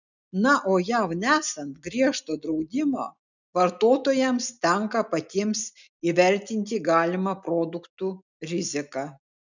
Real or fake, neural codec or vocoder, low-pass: real; none; 7.2 kHz